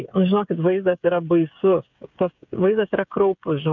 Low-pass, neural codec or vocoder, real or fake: 7.2 kHz; codec, 16 kHz, 8 kbps, FreqCodec, smaller model; fake